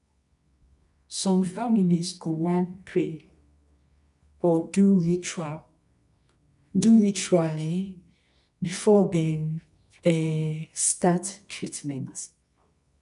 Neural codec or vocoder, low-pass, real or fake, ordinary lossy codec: codec, 24 kHz, 0.9 kbps, WavTokenizer, medium music audio release; 10.8 kHz; fake; none